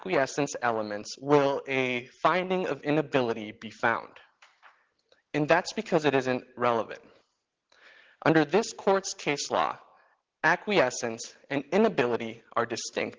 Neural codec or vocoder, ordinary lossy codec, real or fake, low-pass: none; Opus, 16 kbps; real; 7.2 kHz